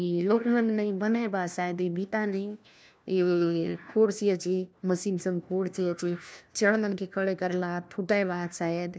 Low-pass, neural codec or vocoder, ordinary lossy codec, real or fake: none; codec, 16 kHz, 1 kbps, FunCodec, trained on LibriTTS, 50 frames a second; none; fake